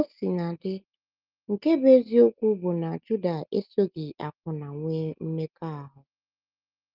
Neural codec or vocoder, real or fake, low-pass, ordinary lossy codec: none; real; 5.4 kHz; Opus, 24 kbps